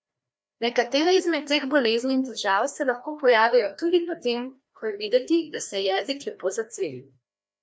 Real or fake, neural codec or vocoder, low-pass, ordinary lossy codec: fake; codec, 16 kHz, 1 kbps, FreqCodec, larger model; none; none